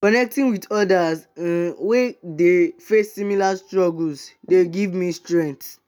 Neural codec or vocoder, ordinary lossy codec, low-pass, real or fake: none; none; 19.8 kHz; real